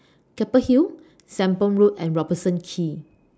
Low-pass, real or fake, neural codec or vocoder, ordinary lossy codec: none; real; none; none